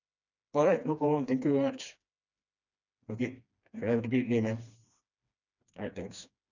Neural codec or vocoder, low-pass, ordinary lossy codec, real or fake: codec, 16 kHz, 2 kbps, FreqCodec, smaller model; 7.2 kHz; none; fake